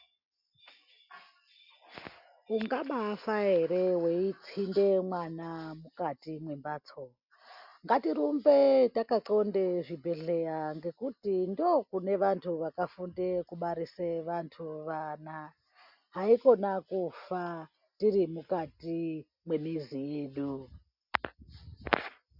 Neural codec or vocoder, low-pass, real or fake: none; 5.4 kHz; real